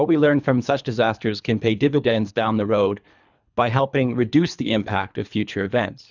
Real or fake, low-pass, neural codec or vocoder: fake; 7.2 kHz; codec, 24 kHz, 3 kbps, HILCodec